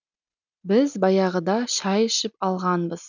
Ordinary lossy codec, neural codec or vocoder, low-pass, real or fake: none; none; 7.2 kHz; real